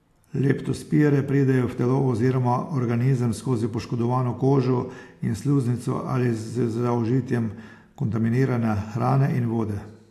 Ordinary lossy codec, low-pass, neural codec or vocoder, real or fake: AAC, 64 kbps; 14.4 kHz; none; real